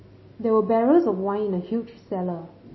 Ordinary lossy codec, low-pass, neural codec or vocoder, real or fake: MP3, 24 kbps; 7.2 kHz; none; real